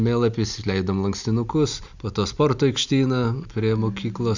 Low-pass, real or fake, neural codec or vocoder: 7.2 kHz; real; none